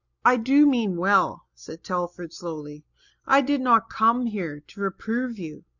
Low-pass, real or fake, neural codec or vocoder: 7.2 kHz; real; none